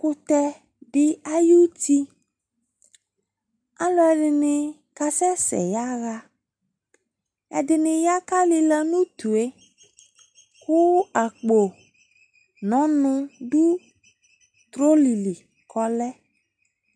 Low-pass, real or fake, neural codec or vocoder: 9.9 kHz; real; none